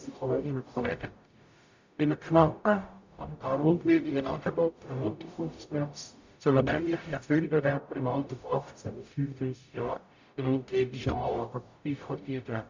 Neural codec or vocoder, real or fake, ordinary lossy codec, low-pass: codec, 44.1 kHz, 0.9 kbps, DAC; fake; MP3, 64 kbps; 7.2 kHz